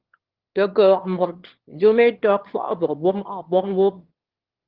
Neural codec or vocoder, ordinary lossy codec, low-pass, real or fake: autoencoder, 22.05 kHz, a latent of 192 numbers a frame, VITS, trained on one speaker; Opus, 16 kbps; 5.4 kHz; fake